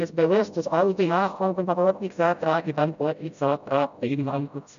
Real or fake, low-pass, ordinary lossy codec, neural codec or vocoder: fake; 7.2 kHz; none; codec, 16 kHz, 0.5 kbps, FreqCodec, smaller model